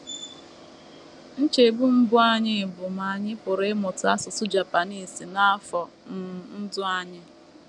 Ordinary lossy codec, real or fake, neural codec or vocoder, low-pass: none; real; none; 10.8 kHz